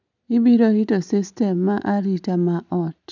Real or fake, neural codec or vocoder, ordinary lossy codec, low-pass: real; none; none; 7.2 kHz